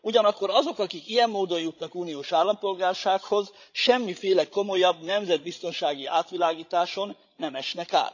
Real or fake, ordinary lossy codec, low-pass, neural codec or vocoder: fake; none; 7.2 kHz; codec, 16 kHz, 16 kbps, FreqCodec, larger model